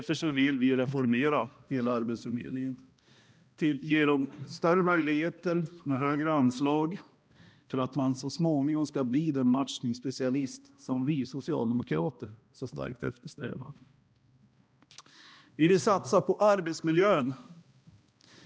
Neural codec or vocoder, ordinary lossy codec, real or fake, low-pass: codec, 16 kHz, 1 kbps, X-Codec, HuBERT features, trained on balanced general audio; none; fake; none